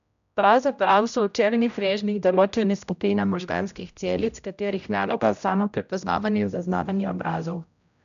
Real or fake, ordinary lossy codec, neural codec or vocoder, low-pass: fake; none; codec, 16 kHz, 0.5 kbps, X-Codec, HuBERT features, trained on general audio; 7.2 kHz